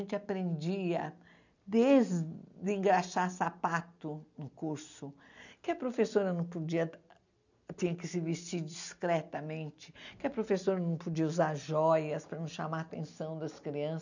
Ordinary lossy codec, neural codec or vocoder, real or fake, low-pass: none; none; real; 7.2 kHz